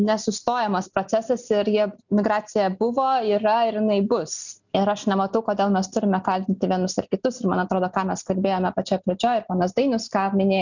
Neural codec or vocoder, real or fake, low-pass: none; real; 7.2 kHz